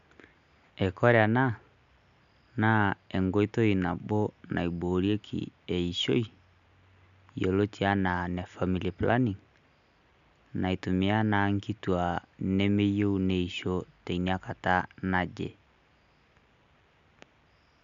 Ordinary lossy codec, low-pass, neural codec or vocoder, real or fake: none; 7.2 kHz; none; real